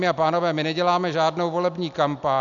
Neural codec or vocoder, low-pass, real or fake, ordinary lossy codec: none; 7.2 kHz; real; MP3, 96 kbps